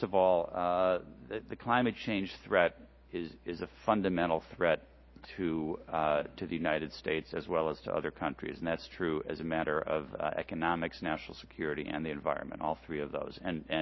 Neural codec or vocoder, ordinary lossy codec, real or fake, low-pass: none; MP3, 24 kbps; real; 7.2 kHz